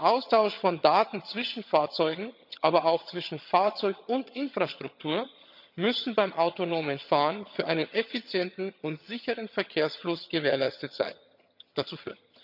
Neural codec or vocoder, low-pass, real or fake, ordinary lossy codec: vocoder, 22.05 kHz, 80 mel bands, HiFi-GAN; 5.4 kHz; fake; none